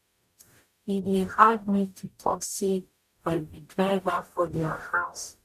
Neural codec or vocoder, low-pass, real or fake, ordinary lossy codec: codec, 44.1 kHz, 0.9 kbps, DAC; 14.4 kHz; fake; none